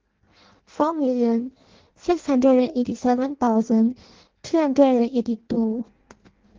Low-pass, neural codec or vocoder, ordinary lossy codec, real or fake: 7.2 kHz; codec, 16 kHz in and 24 kHz out, 0.6 kbps, FireRedTTS-2 codec; Opus, 16 kbps; fake